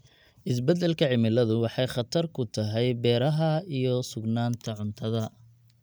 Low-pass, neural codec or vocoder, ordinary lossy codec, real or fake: none; none; none; real